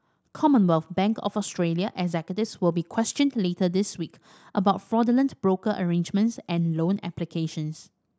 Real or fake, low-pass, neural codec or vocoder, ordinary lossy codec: real; none; none; none